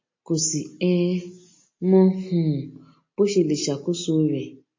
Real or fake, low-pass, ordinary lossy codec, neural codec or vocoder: real; 7.2 kHz; MP3, 32 kbps; none